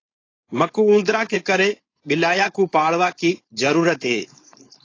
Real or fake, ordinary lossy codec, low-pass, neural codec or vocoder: fake; AAC, 32 kbps; 7.2 kHz; codec, 16 kHz, 4.8 kbps, FACodec